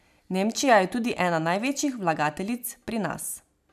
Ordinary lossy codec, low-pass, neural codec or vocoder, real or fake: none; 14.4 kHz; none; real